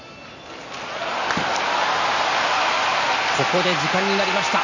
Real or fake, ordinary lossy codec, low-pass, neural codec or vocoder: real; none; 7.2 kHz; none